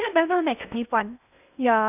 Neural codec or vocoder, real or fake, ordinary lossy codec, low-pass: codec, 16 kHz in and 24 kHz out, 0.6 kbps, FocalCodec, streaming, 2048 codes; fake; none; 3.6 kHz